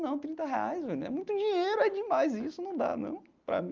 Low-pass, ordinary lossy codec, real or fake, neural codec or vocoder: 7.2 kHz; Opus, 24 kbps; real; none